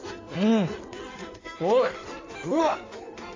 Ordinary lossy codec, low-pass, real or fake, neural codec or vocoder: AAC, 32 kbps; 7.2 kHz; fake; codec, 16 kHz in and 24 kHz out, 1.1 kbps, FireRedTTS-2 codec